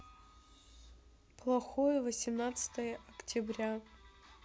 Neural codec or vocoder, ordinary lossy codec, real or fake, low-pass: none; none; real; none